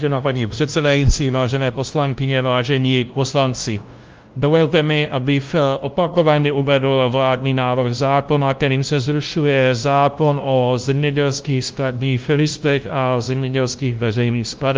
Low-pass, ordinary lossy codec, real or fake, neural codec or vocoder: 7.2 kHz; Opus, 32 kbps; fake; codec, 16 kHz, 0.5 kbps, FunCodec, trained on LibriTTS, 25 frames a second